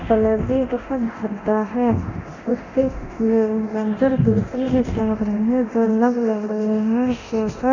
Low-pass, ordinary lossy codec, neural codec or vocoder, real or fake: 7.2 kHz; none; codec, 24 kHz, 0.9 kbps, DualCodec; fake